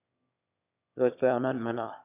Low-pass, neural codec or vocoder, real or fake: 3.6 kHz; autoencoder, 22.05 kHz, a latent of 192 numbers a frame, VITS, trained on one speaker; fake